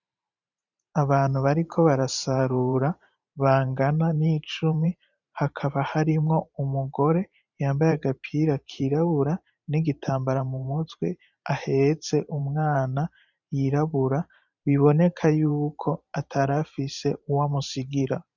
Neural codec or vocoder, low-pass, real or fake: vocoder, 44.1 kHz, 128 mel bands every 512 samples, BigVGAN v2; 7.2 kHz; fake